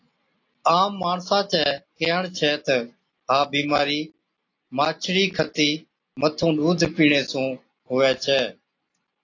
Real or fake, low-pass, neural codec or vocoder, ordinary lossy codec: real; 7.2 kHz; none; AAC, 48 kbps